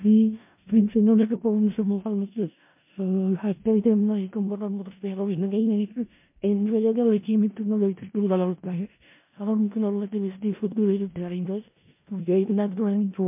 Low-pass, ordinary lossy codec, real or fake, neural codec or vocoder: 3.6 kHz; MP3, 32 kbps; fake; codec, 16 kHz in and 24 kHz out, 0.4 kbps, LongCat-Audio-Codec, four codebook decoder